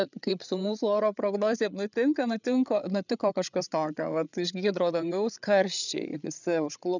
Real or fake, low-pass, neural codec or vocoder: fake; 7.2 kHz; codec, 16 kHz, 8 kbps, FreqCodec, larger model